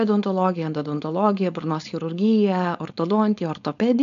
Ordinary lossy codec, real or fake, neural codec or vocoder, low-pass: MP3, 96 kbps; fake; codec, 16 kHz, 4.8 kbps, FACodec; 7.2 kHz